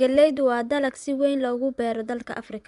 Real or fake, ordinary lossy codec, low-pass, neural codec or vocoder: fake; none; 10.8 kHz; vocoder, 24 kHz, 100 mel bands, Vocos